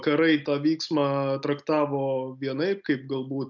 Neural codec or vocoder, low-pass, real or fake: none; 7.2 kHz; real